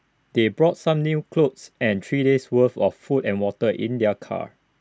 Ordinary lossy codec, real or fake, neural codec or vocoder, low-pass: none; real; none; none